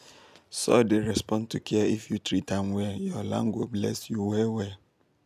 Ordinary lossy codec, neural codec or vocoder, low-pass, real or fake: none; none; 14.4 kHz; real